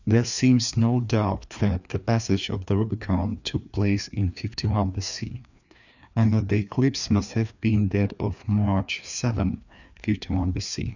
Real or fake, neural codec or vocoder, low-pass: fake; codec, 16 kHz, 2 kbps, FreqCodec, larger model; 7.2 kHz